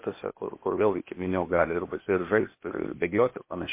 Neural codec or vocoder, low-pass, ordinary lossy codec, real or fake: codec, 16 kHz, 0.8 kbps, ZipCodec; 3.6 kHz; MP3, 24 kbps; fake